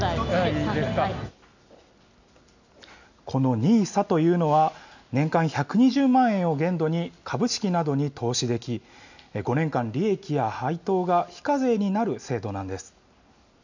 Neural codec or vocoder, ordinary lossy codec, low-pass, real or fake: none; none; 7.2 kHz; real